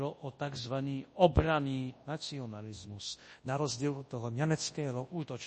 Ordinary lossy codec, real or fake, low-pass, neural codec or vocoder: MP3, 32 kbps; fake; 10.8 kHz; codec, 24 kHz, 0.9 kbps, WavTokenizer, large speech release